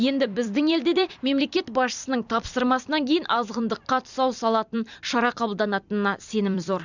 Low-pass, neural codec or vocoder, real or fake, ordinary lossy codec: 7.2 kHz; vocoder, 44.1 kHz, 128 mel bands every 256 samples, BigVGAN v2; fake; none